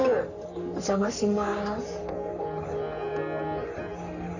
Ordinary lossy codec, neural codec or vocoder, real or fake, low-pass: none; codec, 44.1 kHz, 3.4 kbps, Pupu-Codec; fake; 7.2 kHz